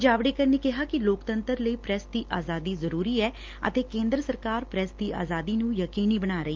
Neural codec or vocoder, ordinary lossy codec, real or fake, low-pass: none; Opus, 32 kbps; real; 7.2 kHz